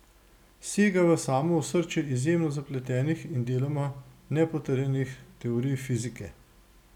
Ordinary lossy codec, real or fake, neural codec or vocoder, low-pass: none; real; none; 19.8 kHz